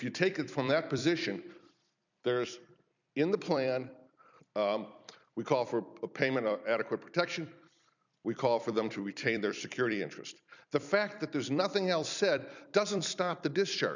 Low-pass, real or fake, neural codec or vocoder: 7.2 kHz; fake; vocoder, 44.1 kHz, 128 mel bands every 256 samples, BigVGAN v2